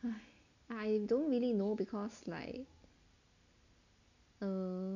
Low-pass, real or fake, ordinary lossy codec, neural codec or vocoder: 7.2 kHz; real; none; none